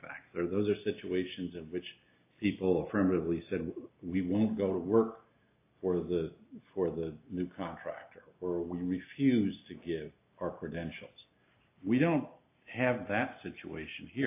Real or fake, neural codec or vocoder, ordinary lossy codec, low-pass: real; none; AAC, 24 kbps; 3.6 kHz